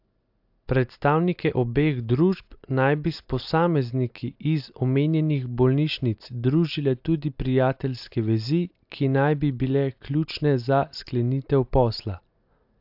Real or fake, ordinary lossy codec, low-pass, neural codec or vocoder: real; AAC, 48 kbps; 5.4 kHz; none